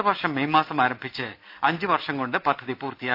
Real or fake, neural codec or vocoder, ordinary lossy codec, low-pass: real; none; none; 5.4 kHz